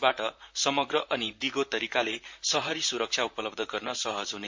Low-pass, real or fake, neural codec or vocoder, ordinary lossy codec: 7.2 kHz; fake; vocoder, 44.1 kHz, 128 mel bands, Pupu-Vocoder; MP3, 48 kbps